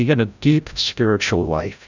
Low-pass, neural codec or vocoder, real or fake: 7.2 kHz; codec, 16 kHz, 0.5 kbps, FreqCodec, larger model; fake